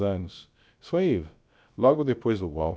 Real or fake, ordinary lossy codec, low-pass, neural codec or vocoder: fake; none; none; codec, 16 kHz, about 1 kbps, DyCAST, with the encoder's durations